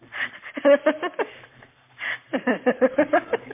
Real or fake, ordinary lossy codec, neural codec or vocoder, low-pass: fake; MP3, 24 kbps; codec, 44.1 kHz, 7.8 kbps, Pupu-Codec; 3.6 kHz